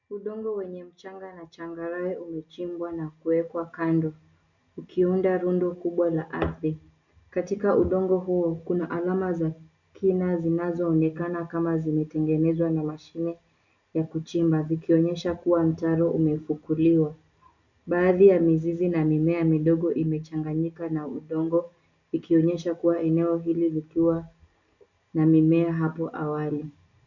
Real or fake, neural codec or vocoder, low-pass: real; none; 7.2 kHz